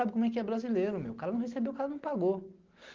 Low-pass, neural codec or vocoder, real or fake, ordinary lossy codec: 7.2 kHz; none; real; Opus, 16 kbps